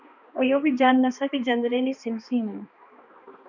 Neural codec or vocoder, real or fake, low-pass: codec, 16 kHz, 2 kbps, X-Codec, HuBERT features, trained on balanced general audio; fake; 7.2 kHz